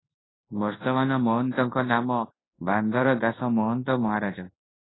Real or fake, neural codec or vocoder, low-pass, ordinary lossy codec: fake; codec, 24 kHz, 0.9 kbps, WavTokenizer, large speech release; 7.2 kHz; AAC, 16 kbps